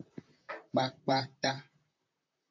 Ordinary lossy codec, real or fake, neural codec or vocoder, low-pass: MP3, 64 kbps; fake; vocoder, 44.1 kHz, 128 mel bands, Pupu-Vocoder; 7.2 kHz